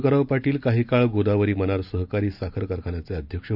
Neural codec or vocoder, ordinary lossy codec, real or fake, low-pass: none; none; real; 5.4 kHz